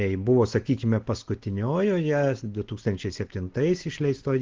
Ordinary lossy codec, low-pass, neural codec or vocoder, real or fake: Opus, 16 kbps; 7.2 kHz; none; real